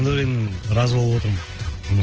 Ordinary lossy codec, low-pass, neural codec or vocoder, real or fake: Opus, 16 kbps; 7.2 kHz; none; real